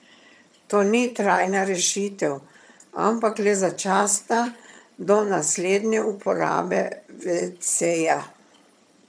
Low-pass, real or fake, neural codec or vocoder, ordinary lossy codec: none; fake; vocoder, 22.05 kHz, 80 mel bands, HiFi-GAN; none